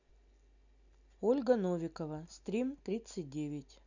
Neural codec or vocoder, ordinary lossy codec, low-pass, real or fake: none; none; 7.2 kHz; real